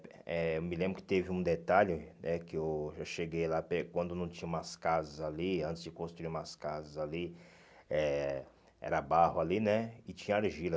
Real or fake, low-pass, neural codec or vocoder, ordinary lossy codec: real; none; none; none